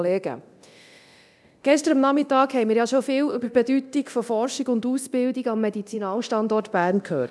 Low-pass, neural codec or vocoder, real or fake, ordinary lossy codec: none; codec, 24 kHz, 0.9 kbps, DualCodec; fake; none